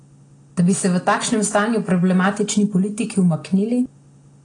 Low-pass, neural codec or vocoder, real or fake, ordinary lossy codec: 9.9 kHz; none; real; AAC, 32 kbps